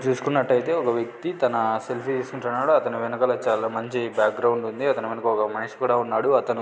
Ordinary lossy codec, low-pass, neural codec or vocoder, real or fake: none; none; none; real